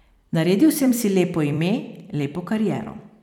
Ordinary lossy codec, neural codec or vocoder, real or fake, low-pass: none; vocoder, 44.1 kHz, 128 mel bands every 256 samples, BigVGAN v2; fake; 19.8 kHz